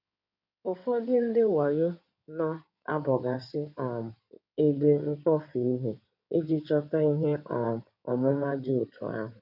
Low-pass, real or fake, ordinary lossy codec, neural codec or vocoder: 5.4 kHz; fake; none; codec, 16 kHz in and 24 kHz out, 2.2 kbps, FireRedTTS-2 codec